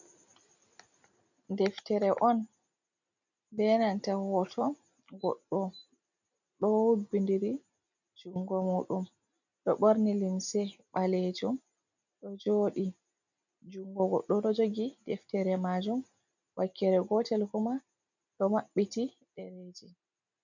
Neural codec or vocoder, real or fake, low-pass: none; real; 7.2 kHz